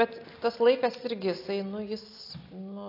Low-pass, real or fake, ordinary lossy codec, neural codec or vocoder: 5.4 kHz; real; AAC, 32 kbps; none